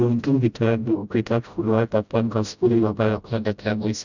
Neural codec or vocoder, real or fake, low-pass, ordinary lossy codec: codec, 16 kHz, 0.5 kbps, FreqCodec, smaller model; fake; 7.2 kHz; none